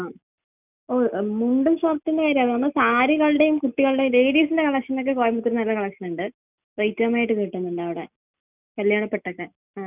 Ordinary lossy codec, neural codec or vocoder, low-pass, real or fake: none; none; 3.6 kHz; real